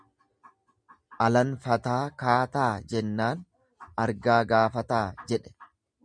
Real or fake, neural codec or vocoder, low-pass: real; none; 9.9 kHz